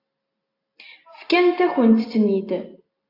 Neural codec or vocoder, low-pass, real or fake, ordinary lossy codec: none; 5.4 kHz; real; AAC, 32 kbps